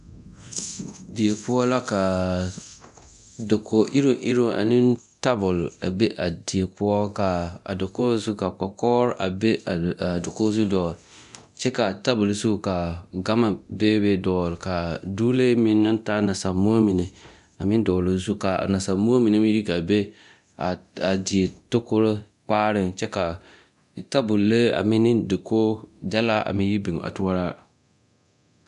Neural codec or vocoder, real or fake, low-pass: codec, 24 kHz, 0.9 kbps, DualCodec; fake; 10.8 kHz